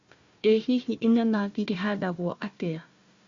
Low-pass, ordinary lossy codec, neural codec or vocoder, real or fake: 7.2 kHz; Opus, 64 kbps; codec, 16 kHz, 1 kbps, FunCodec, trained on Chinese and English, 50 frames a second; fake